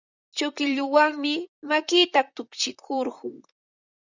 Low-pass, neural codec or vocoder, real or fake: 7.2 kHz; vocoder, 44.1 kHz, 128 mel bands, Pupu-Vocoder; fake